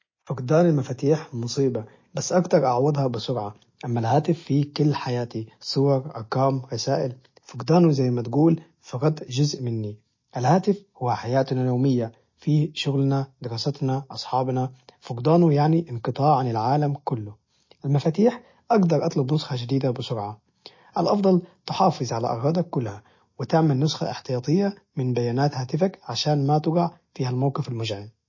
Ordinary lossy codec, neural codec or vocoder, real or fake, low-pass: MP3, 32 kbps; none; real; 7.2 kHz